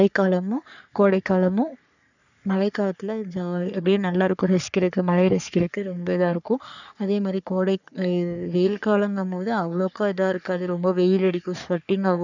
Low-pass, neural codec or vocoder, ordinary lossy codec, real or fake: 7.2 kHz; codec, 44.1 kHz, 3.4 kbps, Pupu-Codec; none; fake